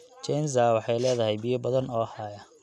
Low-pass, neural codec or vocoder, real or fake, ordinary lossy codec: none; none; real; none